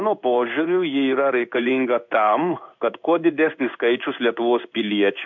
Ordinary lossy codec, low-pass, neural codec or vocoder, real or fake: MP3, 64 kbps; 7.2 kHz; codec, 16 kHz in and 24 kHz out, 1 kbps, XY-Tokenizer; fake